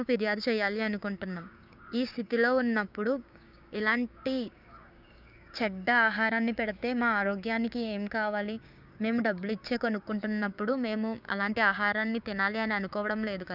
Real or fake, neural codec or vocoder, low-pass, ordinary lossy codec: fake; codec, 16 kHz, 6 kbps, DAC; 5.4 kHz; none